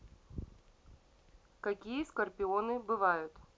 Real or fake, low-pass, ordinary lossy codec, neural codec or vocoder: real; none; none; none